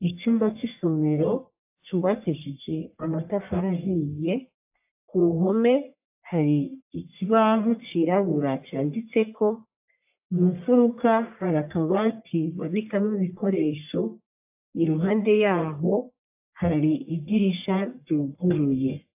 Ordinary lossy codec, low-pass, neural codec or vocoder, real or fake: AAC, 32 kbps; 3.6 kHz; codec, 44.1 kHz, 1.7 kbps, Pupu-Codec; fake